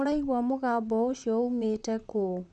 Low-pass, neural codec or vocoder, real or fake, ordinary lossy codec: 10.8 kHz; vocoder, 44.1 kHz, 128 mel bands, Pupu-Vocoder; fake; none